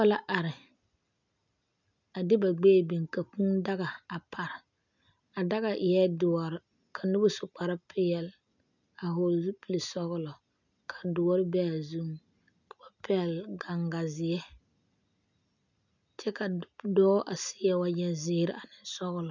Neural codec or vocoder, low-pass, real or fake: none; 7.2 kHz; real